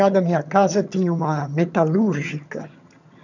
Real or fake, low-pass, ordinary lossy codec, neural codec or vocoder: fake; 7.2 kHz; none; vocoder, 22.05 kHz, 80 mel bands, HiFi-GAN